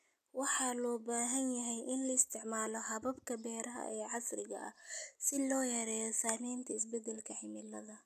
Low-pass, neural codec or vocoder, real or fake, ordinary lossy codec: 14.4 kHz; none; real; none